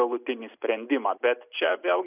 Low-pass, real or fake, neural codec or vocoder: 3.6 kHz; real; none